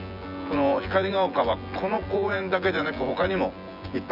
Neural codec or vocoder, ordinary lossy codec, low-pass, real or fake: vocoder, 24 kHz, 100 mel bands, Vocos; none; 5.4 kHz; fake